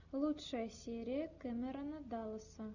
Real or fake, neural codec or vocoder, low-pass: real; none; 7.2 kHz